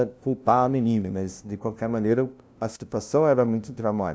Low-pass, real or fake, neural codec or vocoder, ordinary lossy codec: none; fake; codec, 16 kHz, 0.5 kbps, FunCodec, trained on LibriTTS, 25 frames a second; none